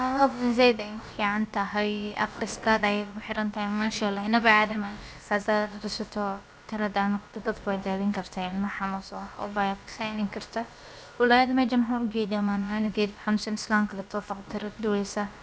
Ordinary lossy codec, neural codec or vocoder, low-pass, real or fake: none; codec, 16 kHz, about 1 kbps, DyCAST, with the encoder's durations; none; fake